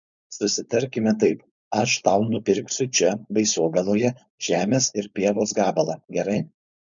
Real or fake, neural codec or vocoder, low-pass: fake; codec, 16 kHz, 4.8 kbps, FACodec; 7.2 kHz